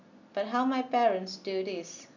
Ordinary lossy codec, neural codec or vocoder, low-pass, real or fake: none; none; 7.2 kHz; real